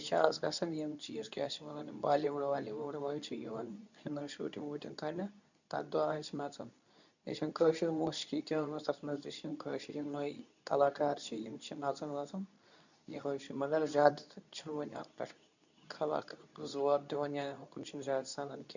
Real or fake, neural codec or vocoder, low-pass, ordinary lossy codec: fake; codec, 24 kHz, 0.9 kbps, WavTokenizer, medium speech release version 2; 7.2 kHz; none